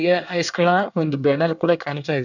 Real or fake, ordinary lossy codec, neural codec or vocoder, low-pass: fake; none; codec, 24 kHz, 1 kbps, SNAC; 7.2 kHz